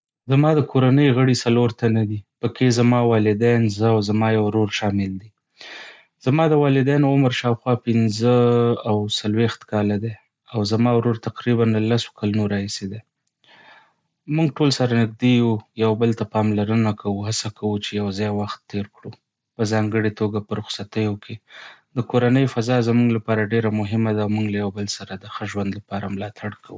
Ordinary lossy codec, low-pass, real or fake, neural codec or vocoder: none; none; real; none